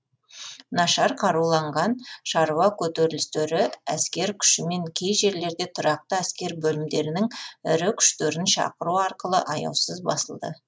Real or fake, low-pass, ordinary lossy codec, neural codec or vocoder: real; none; none; none